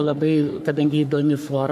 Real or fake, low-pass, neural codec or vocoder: fake; 14.4 kHz; codec, 44.1 kHz, 3.4 kbps, Pupu-Codec